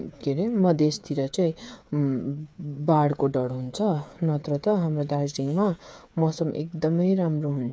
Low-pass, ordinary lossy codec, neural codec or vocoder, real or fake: none; none; codec, 16 kHz, 8 kbps, FreqCodec, smaller model; fake